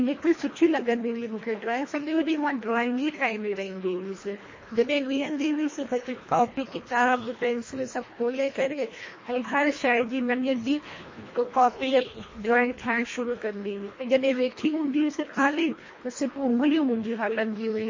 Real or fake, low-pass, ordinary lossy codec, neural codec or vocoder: fake; 7.2 kHz; MP3, 32 kbps; codec, 24 kHz, 1.5 kbps, HILCodec